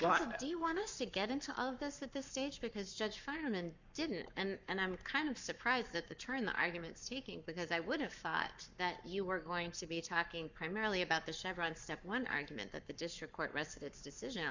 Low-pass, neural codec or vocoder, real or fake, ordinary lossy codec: 7.2 kHz; codec, 44.1 kHz, 7.8 kbps, Pupu-Codec; fake; Opus, 64 kbps